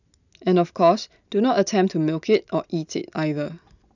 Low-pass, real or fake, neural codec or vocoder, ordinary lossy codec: 7.2 kHz; real; none; none